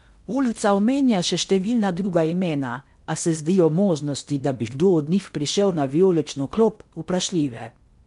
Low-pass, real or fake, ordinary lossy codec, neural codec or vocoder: 10.8 kHz; fake; MP3, 64 kbps; codec, 16 kHz in and 24 kHz out, 0.8 kbps, FocalCodec, streaming, 65536 codes